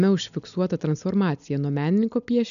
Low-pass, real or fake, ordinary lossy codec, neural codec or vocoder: 7.2 kHz; real; MP3, 96 kbps; none